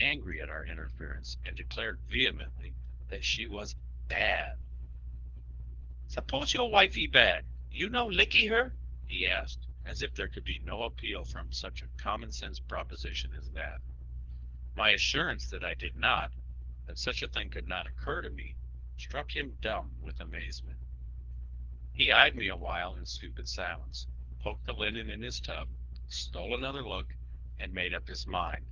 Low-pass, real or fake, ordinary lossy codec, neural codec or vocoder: 7.2 kHz; fake; Opus, 24 kbps; codec, 24 kHz, 3 kbps, HILCodec